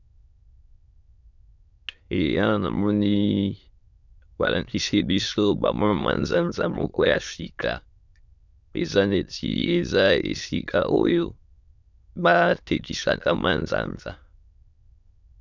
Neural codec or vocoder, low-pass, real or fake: autoencoder, 22.05 kHz, a latent of 192 numbers a frame, VITS, trained on many speakers; 7.2 kHz; fake